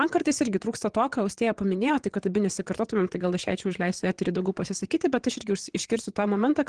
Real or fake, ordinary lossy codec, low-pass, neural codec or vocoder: fake; Opus, 16 kbps; 9.9 kHz; vocoder, 22.05 kHz, 80 mel bands, WaveNeXt